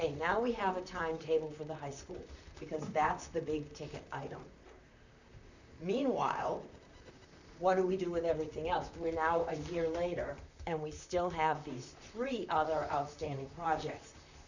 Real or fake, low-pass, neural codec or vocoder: fake; 7.2 kHz; vocoder, 44.1 kHz, 128 mel bands, Pupu-Vocoder